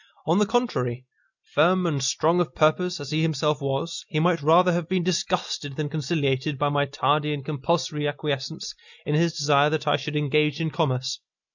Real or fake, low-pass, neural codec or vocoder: real; 7.2 kHz; none